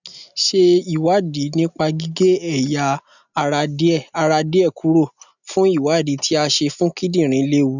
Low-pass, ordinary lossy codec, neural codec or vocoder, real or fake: 7.2 kHz; none; none; real